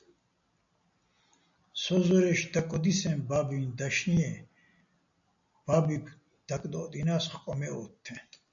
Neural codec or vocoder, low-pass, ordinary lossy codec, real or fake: none; 7.2 kHz; AAC, 64 kbps; real